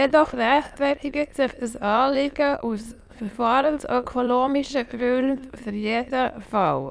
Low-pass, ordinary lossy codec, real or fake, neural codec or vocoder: none; none; fake; autoencoder, 22.05 kHz, a latent of 192 numbers a frame, VITS, trained on many speakers